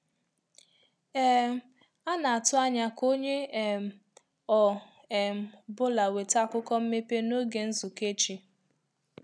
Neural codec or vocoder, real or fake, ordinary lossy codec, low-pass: none; real; none; none